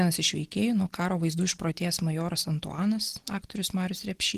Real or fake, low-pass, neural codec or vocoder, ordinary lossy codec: real; 14.4 kHz; none; Opus, 16 kbps